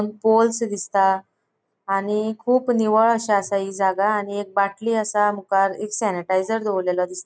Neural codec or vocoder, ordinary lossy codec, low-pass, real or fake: none; none; none; real